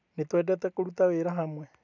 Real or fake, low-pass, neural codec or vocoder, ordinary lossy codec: real; 7.2 kHz; none; none